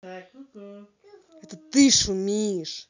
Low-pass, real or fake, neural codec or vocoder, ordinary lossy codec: 7.2 kHz; real; none; none